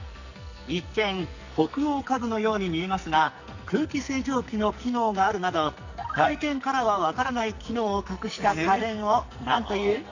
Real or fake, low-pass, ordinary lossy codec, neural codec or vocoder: fake; 7.2 kHz; none; codec, 44.1 kHz, 2.6 kbps, SNAC